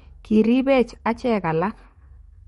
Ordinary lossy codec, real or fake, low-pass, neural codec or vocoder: MP3, 48 kbps; fake; 19.8 kHz; codec, 44.1 kHz, 7.8 kbps, DAC